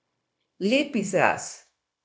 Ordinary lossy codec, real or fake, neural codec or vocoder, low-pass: none; fake; codec, 16 kHz, 0.9 kbps, LongCat-Audio-Codec; none